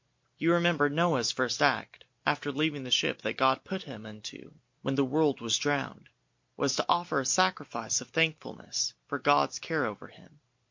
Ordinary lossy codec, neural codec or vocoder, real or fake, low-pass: MP3, 48 kbps; none; real; 7.2 kHz